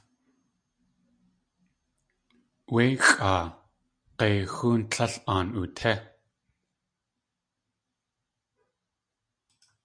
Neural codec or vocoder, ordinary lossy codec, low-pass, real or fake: none; MP3, 96 kbps; 9.9 kHz; real